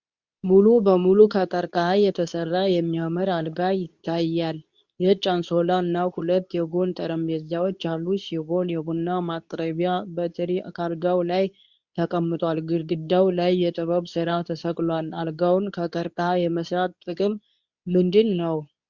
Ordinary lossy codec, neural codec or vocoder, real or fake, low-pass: Opus, 64 kbps; codec, 24 kHz, 0.9 kbps, WavTokenizer, medium speech release version 2; fake; 7.2 kHz